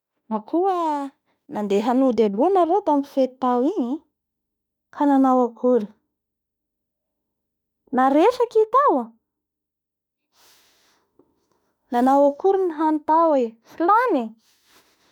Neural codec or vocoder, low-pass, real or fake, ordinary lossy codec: autoencoder, 48 kHz, 32 numbers a frame, DAC-VAE, trained on Japanese speech; 19.8 kHz; fake; none